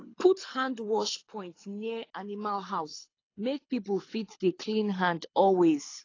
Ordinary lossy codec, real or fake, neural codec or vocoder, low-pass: AAC, 32 kbps; fake; codec, 24 kHz, 6 kbps, HILCodec; 7.2 kHz